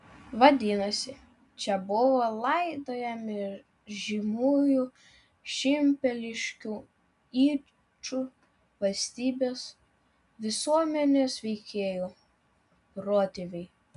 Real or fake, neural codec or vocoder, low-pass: real; none; 10.8 kHz